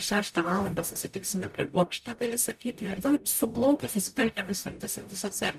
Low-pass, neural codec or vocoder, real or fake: 14.4 kHz; codec, 44.1 kHz, 0.9 kbps, DAC; fake